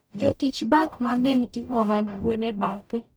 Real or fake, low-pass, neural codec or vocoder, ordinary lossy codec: fake; none; codec, 44.1 kHz, 0.9 kbps, DAC; none